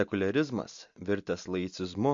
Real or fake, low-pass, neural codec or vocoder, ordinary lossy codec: real; 7.2 kHz; none; MP3, 48 kbps